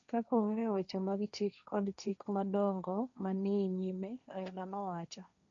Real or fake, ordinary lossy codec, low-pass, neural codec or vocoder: fake; MP3, 64 kbps; 7.2 kHz; codec, 16 kHz, 1.1 kbps, Voila-Tokenizer